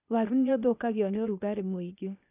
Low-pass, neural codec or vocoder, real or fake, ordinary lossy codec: 3.6 kHz; codec, 16 kHz, 0.8 kbps, ZipCodec; fake; none